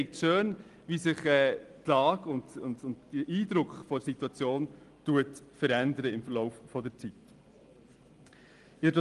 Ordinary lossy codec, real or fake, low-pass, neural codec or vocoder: Opus, 24 kbps; real; 10.8 kHz; none